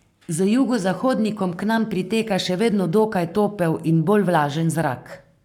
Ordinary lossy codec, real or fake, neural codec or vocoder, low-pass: none; fake; codec, 44.1 kHz, 7.8 kbps, Pupu-Codec; 19.8 kHz